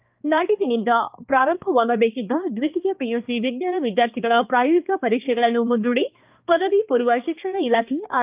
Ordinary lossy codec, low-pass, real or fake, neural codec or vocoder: Opus, 24 kbps; 3.6 kHz; fake; codec, 16 kHz, 2 kbps, X-Codec, HuBERT features, trained on balanced general audio